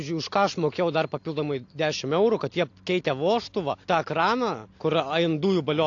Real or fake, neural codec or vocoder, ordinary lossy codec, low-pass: real; none; AAC, 48 kbps; 7.2 kHz